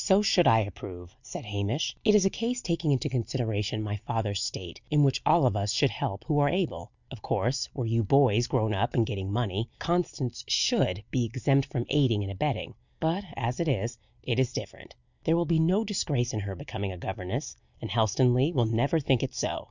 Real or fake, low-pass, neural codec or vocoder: real; 7.2 kHz; none